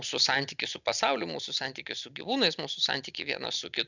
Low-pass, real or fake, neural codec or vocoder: 7.2 kHz; real; none